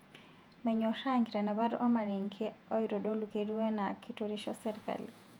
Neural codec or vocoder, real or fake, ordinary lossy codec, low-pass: vocoder, 48 kHz, 128 mel bands, Vocos; fake; none; 19.8 kHz